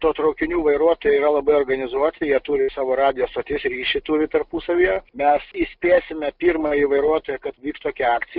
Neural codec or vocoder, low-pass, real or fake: none; 5.4 kHz; real